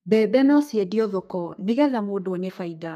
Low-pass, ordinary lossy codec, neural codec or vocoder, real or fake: 14.4 kHz; none; codec, 32 kHz, 1.9 kbps, SNAC; fake